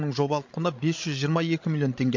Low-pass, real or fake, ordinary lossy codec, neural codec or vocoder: 7.2 kHz; fake; MP3, 48 kbps; codec, 16 kHz, 16 kbps, FreqCodec, larger model